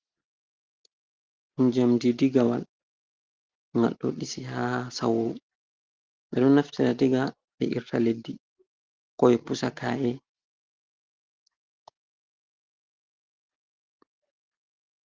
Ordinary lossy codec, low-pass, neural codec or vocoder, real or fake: Opus, 16 kbps; 7.2 kHz; none; real